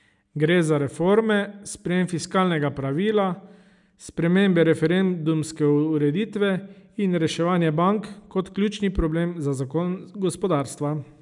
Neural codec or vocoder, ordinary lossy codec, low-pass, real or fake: none; none; 10.8 kHz; real